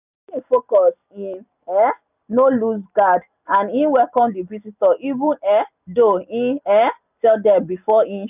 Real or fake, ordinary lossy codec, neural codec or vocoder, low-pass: real; none; none; 3.6 kHz